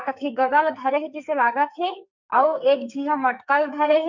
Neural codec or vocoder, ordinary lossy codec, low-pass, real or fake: codec, 44.1 kHz, 2.6 kbps, SNAC; MP3, 64 kbps; 7.2 kHz; fake